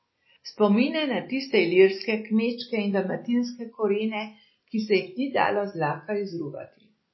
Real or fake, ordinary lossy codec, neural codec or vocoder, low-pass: real; MP3, 24 kbps; none; 7.2 kHz